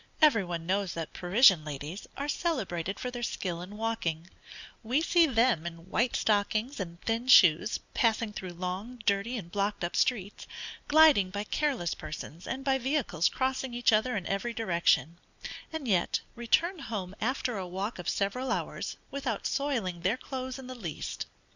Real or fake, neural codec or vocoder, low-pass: real; none; 7.2 kHz